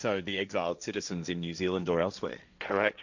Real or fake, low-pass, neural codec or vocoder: fake; 7.2 kHz; codec, 16 kHz, 1.1 kbps, Voila-Tokenizer